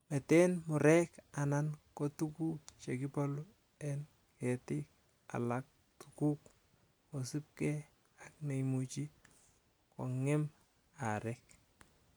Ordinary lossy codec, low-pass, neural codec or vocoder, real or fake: none; none; none; real